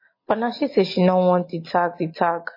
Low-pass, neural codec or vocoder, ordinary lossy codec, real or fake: 5.4 kHz; none; MP3, 24 kbps; real